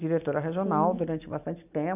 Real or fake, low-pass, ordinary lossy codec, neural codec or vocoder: real; 3.6 kHz; none; none